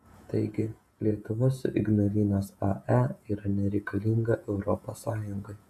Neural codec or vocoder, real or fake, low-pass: vocoder, 48 kHz, 128 mel bands, Vocos; fake; 14.4 kHz